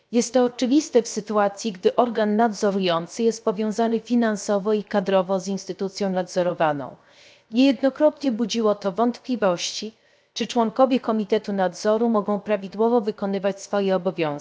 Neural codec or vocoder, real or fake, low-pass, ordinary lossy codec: codec, 16 kHz, 0.7 kbps, FocalCodec; fake; none; none